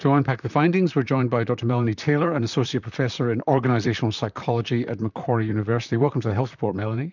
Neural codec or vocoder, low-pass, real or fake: vocoder, 44.1 kHz, 128 mel bands, Pupu-Vocoder; 7.2 kHz; fake